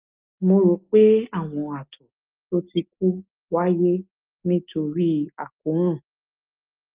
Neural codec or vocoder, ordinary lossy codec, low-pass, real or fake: none; Opus, 24 kbps; 3.6 kHz; real